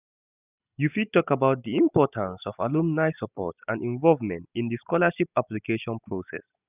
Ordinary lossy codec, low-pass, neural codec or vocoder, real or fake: none; 3.6 kHz; none; real